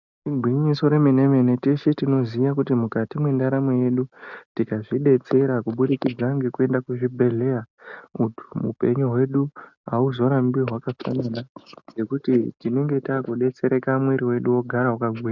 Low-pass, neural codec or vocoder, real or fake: 7.2 kHz; none; real